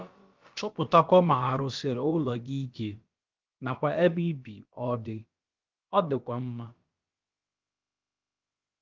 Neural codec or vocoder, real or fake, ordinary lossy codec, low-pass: codec, 16 kHz, about 1 kbps, DyCAST, with the encoder's durations; fake; Opus, 24 kbps; 7.2 kHz